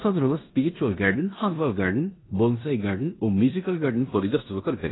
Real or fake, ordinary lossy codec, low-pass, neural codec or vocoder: fake; AAC, 16 kbps; 7.2 kHz; codec, 16 kHz in and 24 kHz out, 0.9 kbps, LongCat-Audio-Codec, four codebook decoder